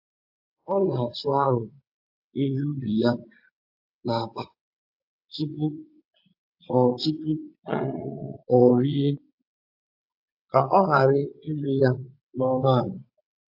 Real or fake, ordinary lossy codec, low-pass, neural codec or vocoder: fake; AAC, 48 kbps; 5.4 kHz; vocoder, 22.05 kHz, 80 mel bands, WaveNeXt